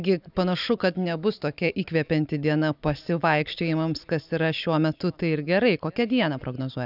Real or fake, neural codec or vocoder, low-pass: real; none; 5.4 kHz